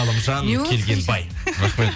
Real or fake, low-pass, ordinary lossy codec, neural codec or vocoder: real; none; none; none